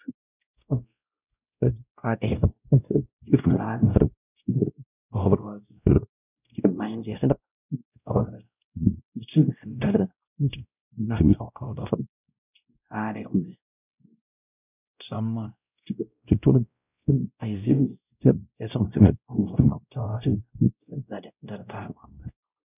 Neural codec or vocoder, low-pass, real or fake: codec, 16 kHz, 0.5 kbps, X-Codec, WavLM features, trained on Multilingual LibriSpeech; 3.6 kHz; fake